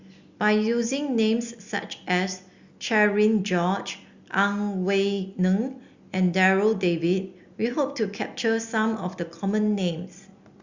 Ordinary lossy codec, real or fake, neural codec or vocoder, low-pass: Opus, 64 kbps; real; none; 7.2 kHz